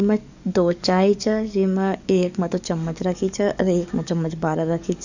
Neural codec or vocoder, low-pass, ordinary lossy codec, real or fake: codec, 44.1 kHz, 7.8 kbps, DAC; 7.2 kHz; none; fake